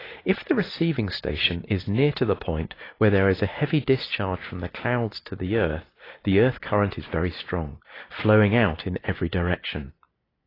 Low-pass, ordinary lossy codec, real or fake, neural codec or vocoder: 5.4 kHz; AAC, 24 kbps; real; none